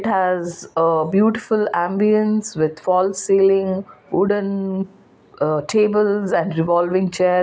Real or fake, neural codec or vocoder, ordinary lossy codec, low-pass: real; none; none; none